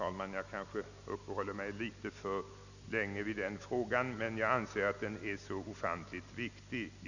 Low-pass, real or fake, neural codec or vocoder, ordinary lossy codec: 7.2 kHz; real; none; none